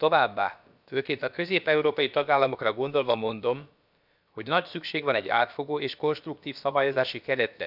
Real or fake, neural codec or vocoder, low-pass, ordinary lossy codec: fake; codec, 16 kHz, about 1 kbps, DyCAST, with the encoder's durations; 5.4 kHz; none